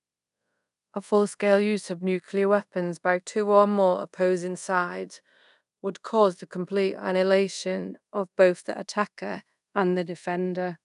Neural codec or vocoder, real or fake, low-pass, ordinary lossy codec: codec, 24 kHz, 0.5 kbps, DualCodec; fake; 10.8 kHz; none